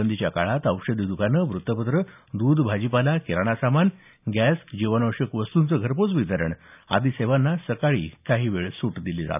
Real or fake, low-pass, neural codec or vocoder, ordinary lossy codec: real; 3.6 kHz; none; none